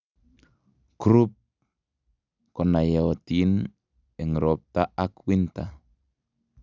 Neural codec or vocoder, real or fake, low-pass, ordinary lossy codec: none; real; 7.2 kHz; none